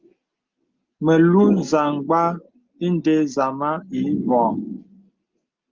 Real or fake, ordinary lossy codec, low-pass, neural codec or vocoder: real; Opus, 24 kbps; 7.2 kHz; none